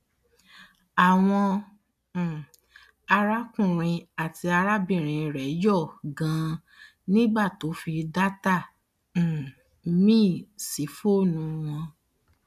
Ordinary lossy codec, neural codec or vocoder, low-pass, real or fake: none; none; 14.4 kHz; real